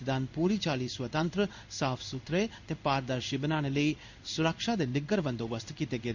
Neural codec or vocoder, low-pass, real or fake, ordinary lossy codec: codec, 16 kHz in and 24 kHz out, 1 kbps, XY-Tokenizer; 7.2 kHz; fake; none